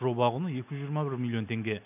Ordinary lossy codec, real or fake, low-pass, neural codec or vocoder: none; real; 3.6 kHz; none